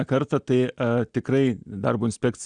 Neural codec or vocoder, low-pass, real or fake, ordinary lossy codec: none; 9.9 kHz; real; Opus, 64 kbps